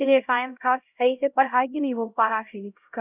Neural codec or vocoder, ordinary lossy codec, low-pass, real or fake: codec, 16 kHz, 0.5 kbps, X-Codec, HuBERT features, trained on LibriSpeech; none; 3.6 kHz; fake